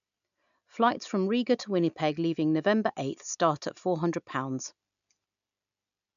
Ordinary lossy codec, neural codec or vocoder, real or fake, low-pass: none; none; real; 7.2 kHz